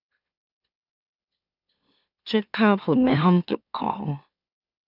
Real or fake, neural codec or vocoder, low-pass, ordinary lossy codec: fake; autoencoder, 44.1 kHz, a latent of 192 numbers a frame, MeloTTS; 5.4 kHz; none